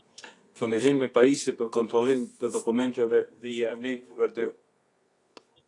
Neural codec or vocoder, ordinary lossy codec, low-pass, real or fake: codec, 24 kHz, 0.9 kbps, WavTokenizer, medium music audio release; AAC, 48 kbps; 10.8 kHz; fake